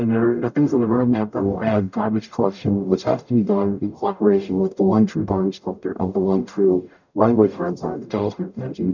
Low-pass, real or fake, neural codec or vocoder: 7.2 kHz; fake; codec, 44.1 kHz, 0.9 kbps, DAC